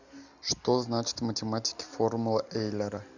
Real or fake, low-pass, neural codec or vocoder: real; 7.2 kHz; none